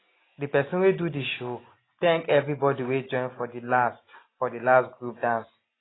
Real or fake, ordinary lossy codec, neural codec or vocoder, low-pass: real; AAC, 16 kbps; none; 7.2 kHz